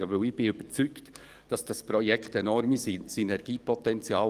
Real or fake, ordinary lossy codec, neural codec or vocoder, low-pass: fake; Opus, 24 kbps; codec, 44.1 kHz, 7.8 kbps, DAC; 14.4 kHz